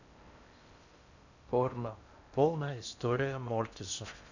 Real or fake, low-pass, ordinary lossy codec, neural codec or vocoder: fake; 7.2 kHz; none; codec, 16 kHz in and 24 kHz out, 0.6 kbps, FocalCodec, streaming, 4096 codes